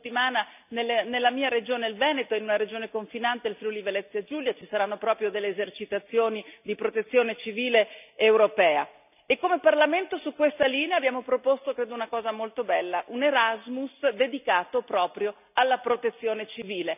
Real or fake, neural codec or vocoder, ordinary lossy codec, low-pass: real; none; none; 3.6 kHz